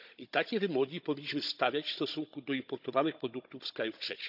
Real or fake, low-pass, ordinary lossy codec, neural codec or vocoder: fake; 5.4 kHz; none; codec, 16 kHz, 16 kbps, FunCodec, trained on Chinese and English, 50 frames a second